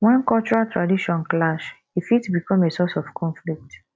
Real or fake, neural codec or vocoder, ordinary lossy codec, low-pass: real; none; none; none